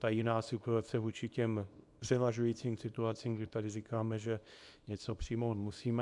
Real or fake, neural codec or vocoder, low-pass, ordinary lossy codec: fake; codec, 24 kHz, 0.9 kbps, WavTokenizer, small release; 10.8 kHz; MP3, 96 kbps